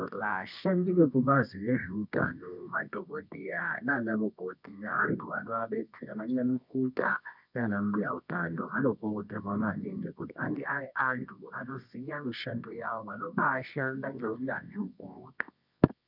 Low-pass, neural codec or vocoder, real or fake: 5.4 kHz; codec, 24 kHz, 0.9 kbps, WavTokenizer, medium music audio release; fake